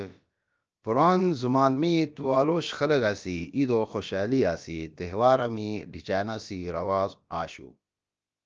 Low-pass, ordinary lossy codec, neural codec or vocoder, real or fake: 7.2 kHz; Opus, 24 kbps; codec, 16 kHz, about 1 kbps, DyCAST, with the encoder's durations; fake